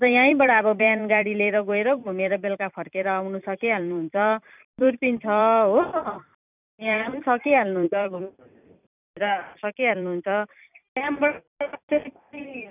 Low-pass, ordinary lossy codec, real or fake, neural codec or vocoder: 3.6 kHz; none; fake; vocoder, 44.1 kHz, 128 mel bands every 256 samples, BigVGAN v2